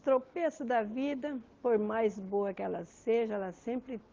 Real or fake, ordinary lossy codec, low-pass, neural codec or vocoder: real; Opus, 16 kbps; 7.2 kHz; none